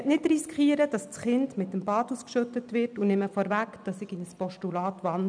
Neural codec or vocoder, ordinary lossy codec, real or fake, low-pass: none; none; real; 9.9 kHz